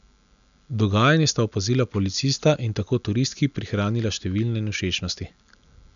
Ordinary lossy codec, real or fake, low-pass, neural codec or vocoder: none; real; 7.2 kHz; none